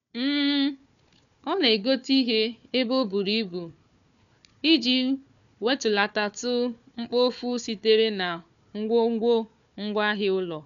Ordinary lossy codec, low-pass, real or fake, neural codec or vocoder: none; 7.2 kHz; fake; codec, 16 kHz, 4 kbps, FunCodec, trained on Chinese and English, 50 frames a second